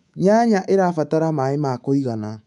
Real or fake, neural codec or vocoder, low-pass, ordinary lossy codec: fake; codec, 24 kHz, 3.1 kbps, DualCodec; 10.8 kHz; MP3, 96 kbps